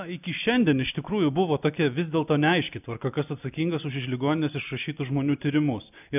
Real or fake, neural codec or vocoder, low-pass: real; none; 3.6 kHz